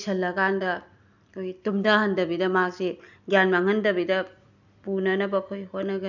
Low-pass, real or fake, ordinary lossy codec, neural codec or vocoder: 7.2 kHz; real; none; none